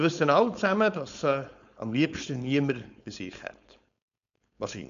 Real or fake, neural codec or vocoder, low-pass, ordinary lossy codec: fake; codec, 16 kHz, 4.8 kbps, FACodec; 7.2 kHz; none